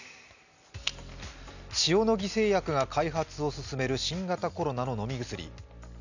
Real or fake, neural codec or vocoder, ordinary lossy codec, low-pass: real; none; none; 7.2 kHz